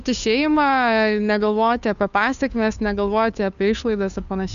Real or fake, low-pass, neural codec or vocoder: fake; 7.2 kHz; codec, 16 kHz, 2 kbps, FunCodec, trained on Chinese and English, 25 frames a second